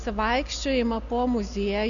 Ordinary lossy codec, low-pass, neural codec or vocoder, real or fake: MP3, 96 kbps; 7.2 kHz; none; real